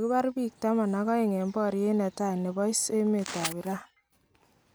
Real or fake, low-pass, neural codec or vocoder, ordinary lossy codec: real; none; none; none